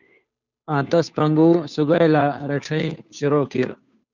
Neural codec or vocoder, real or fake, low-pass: codec, 16 kHz, 2 kbps, FunCodec, trained on Chinese and English, 25 frames a second; fake; 7.2 kHz